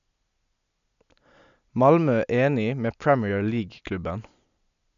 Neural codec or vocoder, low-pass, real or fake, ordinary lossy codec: none; 7.2 kHz; real; none